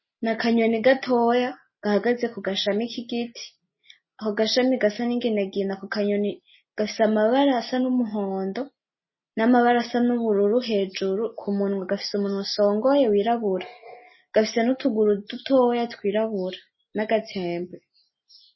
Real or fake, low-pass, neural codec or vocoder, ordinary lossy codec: real; 7.2 kHz; none; MP3, 24 kbps